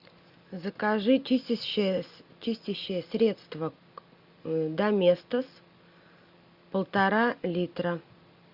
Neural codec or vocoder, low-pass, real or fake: none; 5.4 kHz; real